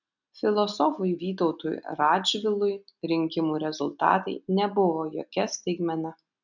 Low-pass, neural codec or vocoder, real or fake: 7.2 kHz; none; real